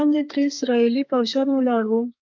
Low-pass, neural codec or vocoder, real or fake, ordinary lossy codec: 7.2 kHz; codec, 32 kHz, 1.9 kbps, SNAC; fake; MP3, 64 kbps